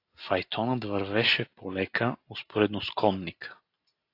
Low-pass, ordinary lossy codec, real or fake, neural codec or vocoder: 5.4 kHz; AAC, 32 kbps; real; none